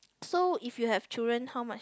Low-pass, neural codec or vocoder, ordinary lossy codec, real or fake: none; none; none; real